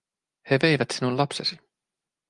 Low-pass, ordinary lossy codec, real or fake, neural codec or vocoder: 10.8 kHz; Opus, 32 kbps; real; none